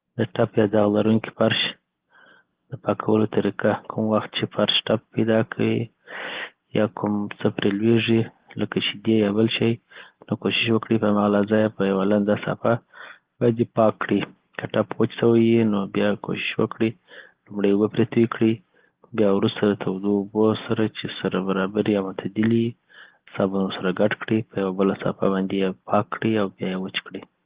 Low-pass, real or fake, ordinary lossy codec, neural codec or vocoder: 3.6 kHz; real; Opus, 16 kbps; none